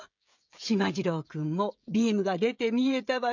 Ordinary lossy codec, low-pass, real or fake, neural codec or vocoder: none; 7.2 kHz; fake; codec, 16 kHz, 16 kbps, FreqCodec, smaller model